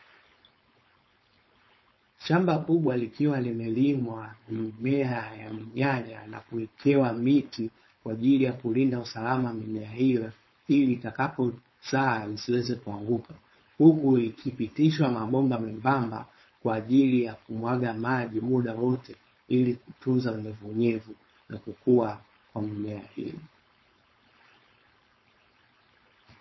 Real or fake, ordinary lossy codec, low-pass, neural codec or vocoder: fake; MP3, 24 kbps; 7.2 kHz; codec, 16 kHz, 4.8 kbps, FACodec